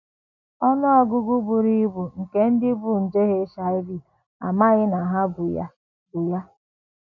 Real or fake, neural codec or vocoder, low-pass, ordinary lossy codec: real; none; 7.2 kHz; none